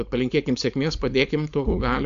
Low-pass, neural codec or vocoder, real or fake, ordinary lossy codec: 7.2 kHz; codec, 16 kHz, 4.8 kbps, FACodec; fake; MP3, 64 kbps